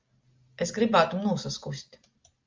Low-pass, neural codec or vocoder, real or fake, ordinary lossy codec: 7.2 kHz; none; real; Opus, 32 kbps